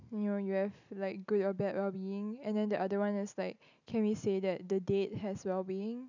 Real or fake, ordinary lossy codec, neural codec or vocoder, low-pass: real; none; none; 7.2 kHz